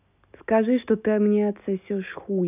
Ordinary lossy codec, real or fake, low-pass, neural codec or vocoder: none; real; 3.6 kHz; none